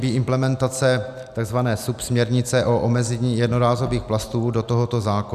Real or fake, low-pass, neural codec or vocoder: real; 14.4 kHz; none